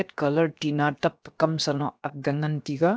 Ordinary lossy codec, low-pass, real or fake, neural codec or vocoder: none; none; fake; codec, 16 kHz, about 1 kbps, DyCAST, with the encoder's durations